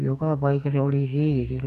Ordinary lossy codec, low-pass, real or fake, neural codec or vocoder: none; 14.4 kHz; fake; codec, 44.1 kHz, 2.6 kbps, SNAC